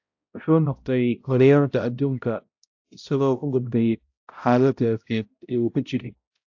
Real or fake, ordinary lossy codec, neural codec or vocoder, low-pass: fake; AAC, 48 kbps; codec, 16 kHz, 0.5 kbps, X-Codec, HuBERT features, trained on balanced general audio; 7.2 kHz